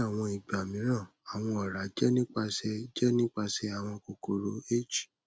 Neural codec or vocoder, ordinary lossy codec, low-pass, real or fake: none; none; none; real